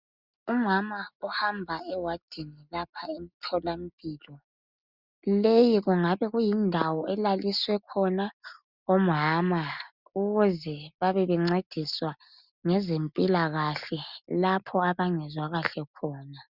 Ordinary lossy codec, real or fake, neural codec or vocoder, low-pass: Opus, 64 kbps; real; none; 5.4 kHz